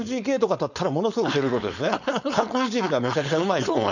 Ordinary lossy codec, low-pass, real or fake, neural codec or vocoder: none; 7.2 kHz; fake; codec, 16 kHz, 4.8 kbps, FACodec